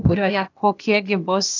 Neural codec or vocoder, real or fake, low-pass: codec, 16 kHz, 0.8 kbps, ZipCodec; fake; 7.2 kHz